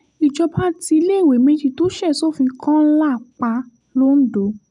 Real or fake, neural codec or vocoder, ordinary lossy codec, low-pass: real; none; none; 10.8 kHz